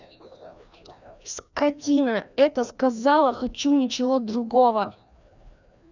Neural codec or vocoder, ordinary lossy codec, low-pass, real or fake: codec, 16 kHz, 1 kbps, FreqCodec, larger model; none; 7.2 kHz; fake